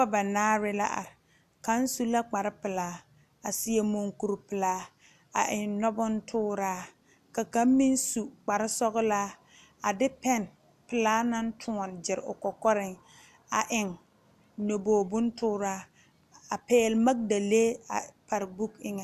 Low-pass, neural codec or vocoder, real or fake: 14.4 kHz; none; real